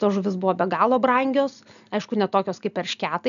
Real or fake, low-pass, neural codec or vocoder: real; 7.2 kHz; none